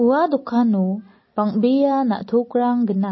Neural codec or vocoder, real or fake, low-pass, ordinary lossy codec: none; real; 7.2 kHz; MP3, 24 kbps